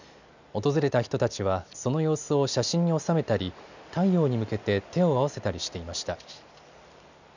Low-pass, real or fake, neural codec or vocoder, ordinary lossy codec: 7.2 kHz; real; none; none